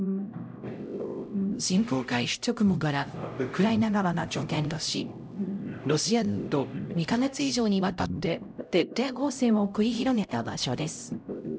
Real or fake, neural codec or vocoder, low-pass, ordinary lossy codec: fake; codec, 16 kHz, 0.5 kbps, X-Codec, HuBERT features, trained on LibriSpeech; none; none